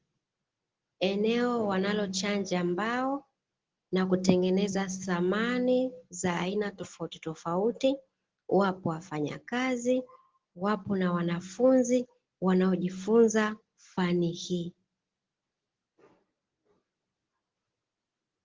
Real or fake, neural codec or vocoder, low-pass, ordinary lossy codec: real; none; 7.2 kHz; Opus, 16 kbps